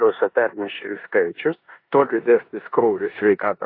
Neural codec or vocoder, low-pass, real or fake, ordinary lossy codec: codec, 16 kHz in and 24 kHz out, 0.9 kbps, LongCat-Audio-Codec, four codebook decoder; 5.4 kHz; fake; AAC, 32 kbps